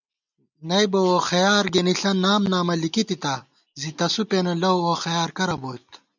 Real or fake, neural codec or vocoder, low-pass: real; none; 7.2 kHz